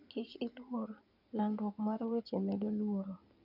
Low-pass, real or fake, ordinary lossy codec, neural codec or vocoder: 5.4 kHz; fake; none; codec, 16 kHz in and 24 kHz out, 2.2 kbps, FireRedTTS-2 codec